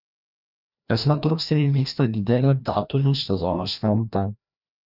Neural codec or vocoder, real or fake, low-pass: codec, 16 kHz, 1 kbps, FreqCodec, larger model; fake; 5.4 kHz